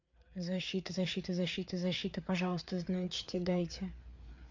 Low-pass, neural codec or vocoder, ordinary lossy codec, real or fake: 7.2 kHz; codec, 16 kHz, 4 kbps, FreqCodec, larger model; MP3, 48 kbps; fake